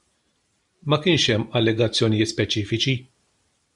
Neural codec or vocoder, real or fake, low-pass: vocoder, 44.1 kHz, 128 mel bands every 512 samples, BigVGAN v2; fake; 10.8 kHz